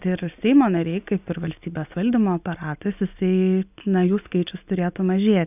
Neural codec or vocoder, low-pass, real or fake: none; 3.6 kHz; real